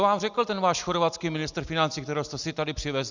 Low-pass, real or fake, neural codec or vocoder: 7.2 kHz; real; none